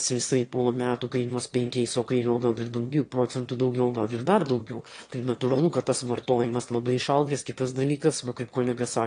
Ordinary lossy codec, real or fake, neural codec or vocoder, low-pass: AAC, 48 kbps; fake; autoencoder, 22.05 kHz, a latent of 192 numbers a frame, VITS, trained on one speaker; 9.9 kHz